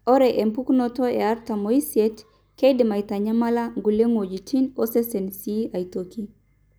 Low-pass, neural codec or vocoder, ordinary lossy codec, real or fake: none; none; none; real